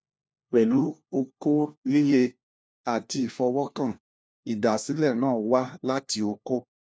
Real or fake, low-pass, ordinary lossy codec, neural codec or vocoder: fake; none; none; codec, 16 kHz, 1 kbps, FunCodec, trained on LibriTTS, 50 frames a second